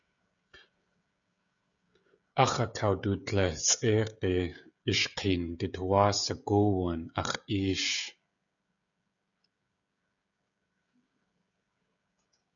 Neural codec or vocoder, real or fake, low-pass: codec, 16 kHz, 16 kbps, FreqCodec, smaller model; fake; 7.2 kHz